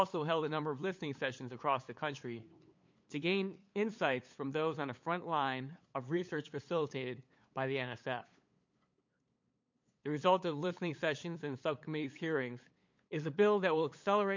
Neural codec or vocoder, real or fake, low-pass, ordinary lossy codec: codec, 16 kHz, 8 kbps, FunCodec, trained on LibriTTS, 25 frames a second; fake; 7.2 kHz; MP3, 48 kbps